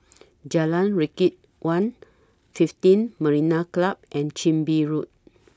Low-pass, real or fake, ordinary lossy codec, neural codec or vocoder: none; real; none; none